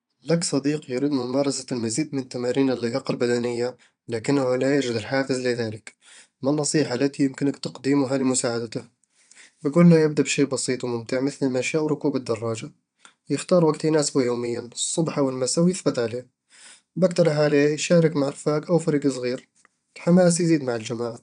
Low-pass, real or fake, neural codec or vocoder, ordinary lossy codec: 9.9 kHz; fake; vocoder, 22.05 kHz, 80 mel bands, Vocos; none